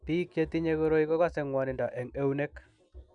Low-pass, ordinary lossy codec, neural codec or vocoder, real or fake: 9.9 kHz; none; none; real